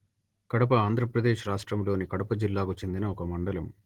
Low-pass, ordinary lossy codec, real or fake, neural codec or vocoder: 19.8 kHz; Opus, 16 kbps; real; none